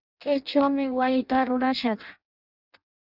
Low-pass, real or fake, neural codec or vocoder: 5.4 kHz; fake; codec, 16 kHz in and 24 kHz out, 0.6 kbps, FireRedTTS-2 codec